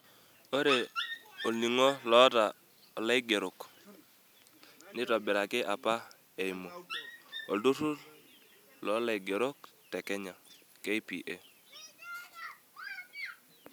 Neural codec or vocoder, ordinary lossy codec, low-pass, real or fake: none; none; none; real